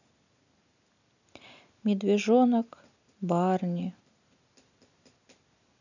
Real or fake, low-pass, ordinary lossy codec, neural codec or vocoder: real; 7.2 kHz; none; none